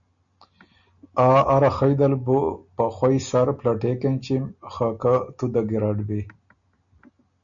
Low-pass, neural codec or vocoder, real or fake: 7.2 kHz; none; real